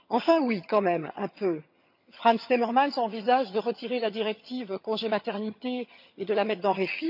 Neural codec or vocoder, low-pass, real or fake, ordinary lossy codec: vocoder, 22.05 kHz, 80 mel bands, HiFi-GAN; 5.4 kHz; fake; none